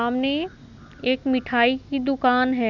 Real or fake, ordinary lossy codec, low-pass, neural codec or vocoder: real; none; 7.2 kHz; none